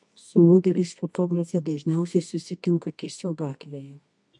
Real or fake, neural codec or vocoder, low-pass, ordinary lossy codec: fake; codec, 24 kHz, 0.9 kbps, WavTokenizer, medium music audio release; 10.8 kHz; MP3, 64 kbps